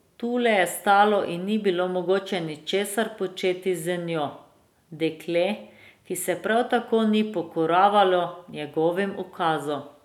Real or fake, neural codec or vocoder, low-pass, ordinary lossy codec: real; none; 19.8 kHz; none